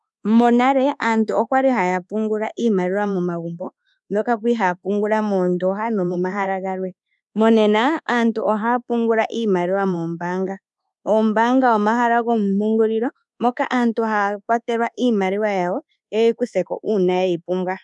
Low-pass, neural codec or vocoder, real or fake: 10.8 kHz; codec, 24 kHz, 1.2 kbps, DualCodec; fake